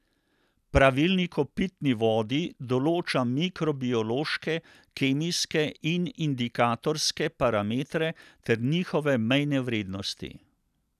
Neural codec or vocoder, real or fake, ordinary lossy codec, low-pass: none; real; none; 14.4 kHz